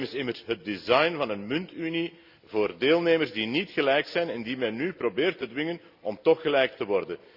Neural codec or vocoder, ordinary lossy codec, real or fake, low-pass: none; Opus, 64 kbps; real; 5.4 kHz